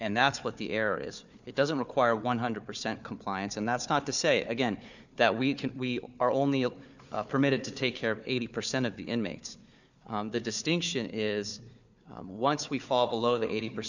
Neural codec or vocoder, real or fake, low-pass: codec, 16 kHz, 4 kbps, FunCodec, trained on Chinese and English, 50 frames a second; fake; 7.2 kHz